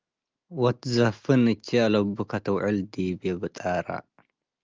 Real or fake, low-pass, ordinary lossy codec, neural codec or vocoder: real; 7.2 kHz; Opus, 24 kbps; none